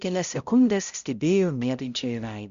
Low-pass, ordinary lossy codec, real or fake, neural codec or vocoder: 7.2 kHz; Opus, 64 kbps; fake; codec, 16 kHz, 0.5 kbps, X-Codec, HuBERT features, trained on balanced general audio